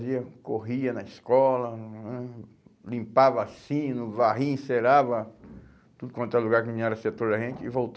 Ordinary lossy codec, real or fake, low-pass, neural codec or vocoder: none; real; none; none